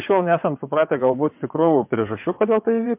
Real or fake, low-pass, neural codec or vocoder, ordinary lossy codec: fake; 3.6 kHz; vocoder, 22.05 kHz, 80 mel bands, WaveNeXt; MP3, 32 kbps